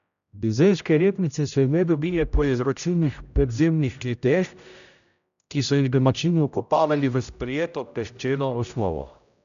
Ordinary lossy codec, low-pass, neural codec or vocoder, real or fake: none; 7.2 kHz; codec, 16 kHz, 0.5 kbps, X-Codec, HuBERT features, trained on general audio; fake